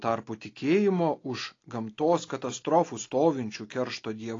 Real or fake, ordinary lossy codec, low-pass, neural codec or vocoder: real; AAC, 32 kbps; 7.2 kHz; none